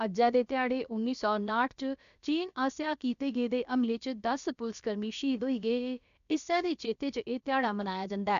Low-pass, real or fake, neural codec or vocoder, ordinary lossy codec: 7.2 kHz; fake; codec, 16 kHz, about 1 kbps, DyCAST, with the encoder's durations; none